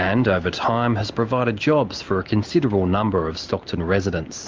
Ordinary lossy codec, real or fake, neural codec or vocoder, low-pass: Opus, 32 kbps; real; none; 7.2 kHz